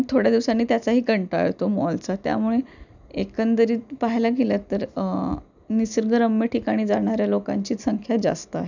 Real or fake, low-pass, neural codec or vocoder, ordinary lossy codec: real; 7.2 kHz; none; none